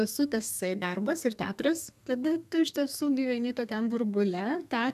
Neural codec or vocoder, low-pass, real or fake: codec, 32 kHz, 1.9 kbps, SNAC; 14.4 kHz; fake